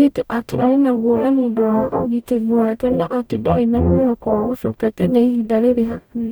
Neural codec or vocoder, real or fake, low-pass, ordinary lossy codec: codec, 44.1 kHz, 0.9 kbps, DAC; fake; none; none